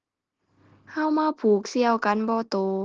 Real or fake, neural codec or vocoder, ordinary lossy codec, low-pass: real; none; Opus, 16 kbps; 7.2 kHz